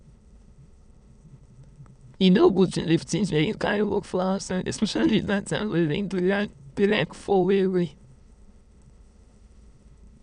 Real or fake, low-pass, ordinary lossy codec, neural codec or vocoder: fake; 9.9 kHz; none; autoencoder, 22.05 kHz, a latent of 192 numbers a frame, VITS, trained on many speakers